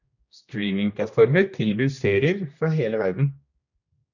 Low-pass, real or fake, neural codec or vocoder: 7.2 kHz; fake; codec, 16 kHz, 1 kbps, X-Codec, HuBERT features, trained on general audio